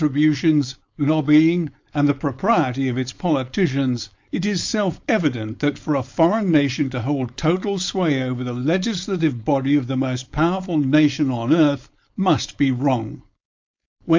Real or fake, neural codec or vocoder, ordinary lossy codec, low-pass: fake; codec, 16 kHz, 4.8 kbps, FACodec; MP3, 48 kbps; 7.2 kHz